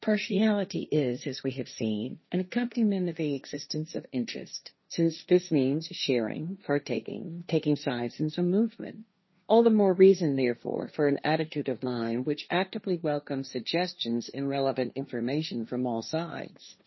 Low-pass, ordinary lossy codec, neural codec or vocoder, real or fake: 7.2 kHz; MP3, 24 kbps; codec, 16 kHz, 1.1 kbps, Voila-Tokenizer; fake